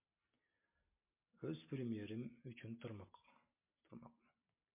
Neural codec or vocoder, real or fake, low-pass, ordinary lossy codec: none; real; 3.6 kHz; MP3, 24 kbps